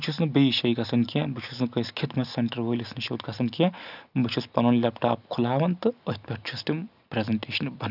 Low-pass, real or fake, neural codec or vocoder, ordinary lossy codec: 5.4 kHz; real; none; none